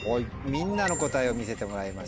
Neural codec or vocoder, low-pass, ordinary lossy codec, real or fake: none; none; none; real